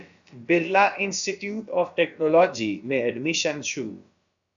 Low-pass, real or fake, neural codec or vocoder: 7.2 kHz; fake; codec, 16 kHz, about 1 kbps, DyCAST, with the encoder's durations